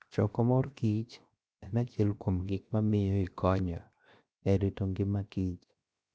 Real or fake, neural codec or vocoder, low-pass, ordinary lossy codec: fake; codec, 16 kHz, 0.7 kbps, FocalCodec; none; none